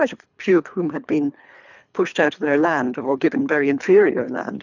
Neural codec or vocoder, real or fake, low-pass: codec, 24 kHz, 3 kbps, HILCodec; fake; 7.2 kHz